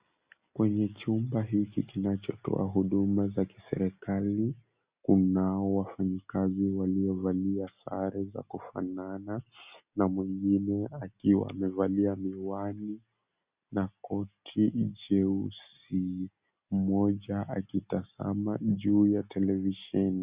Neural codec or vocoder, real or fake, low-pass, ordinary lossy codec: none; real; 3.6 kHz; Opus, 64 kbps